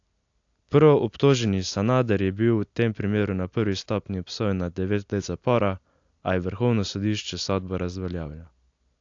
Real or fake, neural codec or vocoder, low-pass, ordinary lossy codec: real; none; 7.2 kHz; AAC, 64 kbps